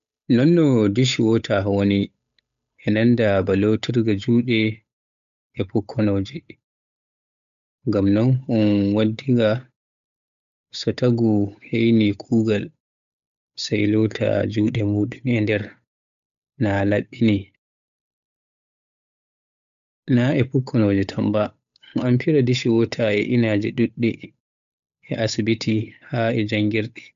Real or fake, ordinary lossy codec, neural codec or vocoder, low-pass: fake; MP3, 96 kbps; codec, 16 kHz, 8 kbps, FunCodec, trained on Chinese and English, 25 frames a second; 7.2 kHz